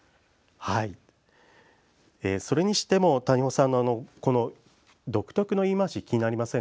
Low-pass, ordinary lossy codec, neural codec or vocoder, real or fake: none; none; none; real